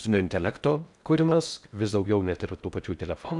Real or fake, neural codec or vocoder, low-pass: fake; codec, 16 kHz in and 24 kHz out, 0.6 kbps, FocalCodec, streaming, 4096 codes; 10.8 kHz